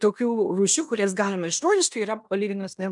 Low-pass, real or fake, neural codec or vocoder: 10.8 kHz; fake; codec, 16 kHz in and 24 kHz out, 0.9 kbps, LongCat-Audio-Codec, fine tuned four codebook decoder